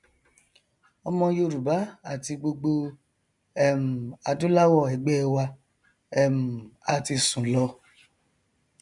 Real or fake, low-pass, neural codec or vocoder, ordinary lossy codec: real; 10.8 kHz; none; none